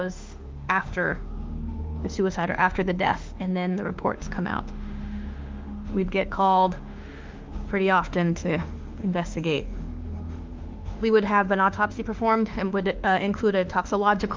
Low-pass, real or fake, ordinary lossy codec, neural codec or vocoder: 7.2 kHz; fake; Opus, 24 kbps; autoencoder, 48 kHz, 32 numbers a frame, DAC-VAE, trained on Japanese speech